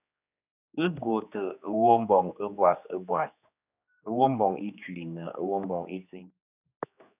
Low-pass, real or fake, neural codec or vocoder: 3.6 kHz; fake; codec, 16 kHz, 2 kbps, X-Codec, HuBERT features, trained on general audio